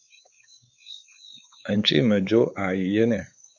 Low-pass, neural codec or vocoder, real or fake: 7.2 kHz; codec, 16 kHz, 4 kbps, X-Codec, WavLM features, trained on Multilingual LibriSpeech; fake